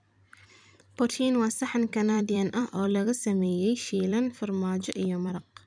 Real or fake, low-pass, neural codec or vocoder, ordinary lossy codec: fake; 9.9 kHz; vocoder, 44.1 kHz, 128 mel bands every 256 samples, BigVGAN v2; none